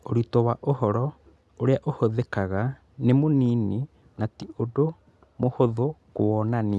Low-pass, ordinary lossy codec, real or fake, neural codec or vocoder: none; none; real; none